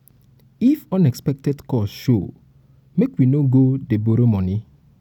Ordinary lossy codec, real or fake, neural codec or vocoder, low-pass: none; real; none; 19.8 kHz